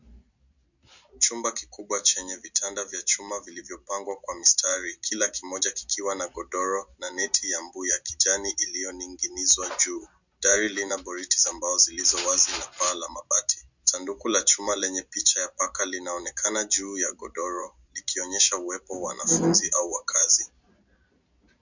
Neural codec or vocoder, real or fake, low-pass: none; real; 7.2 kHz